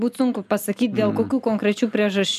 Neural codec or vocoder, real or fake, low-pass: none; real; 14.4 kHz